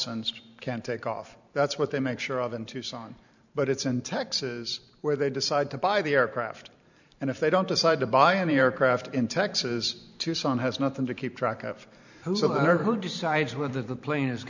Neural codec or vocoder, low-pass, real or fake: vocoder, 44.1 kHz, 128 mel bands every 512 samples, BigVGAN v2; 7.2 kHz; fake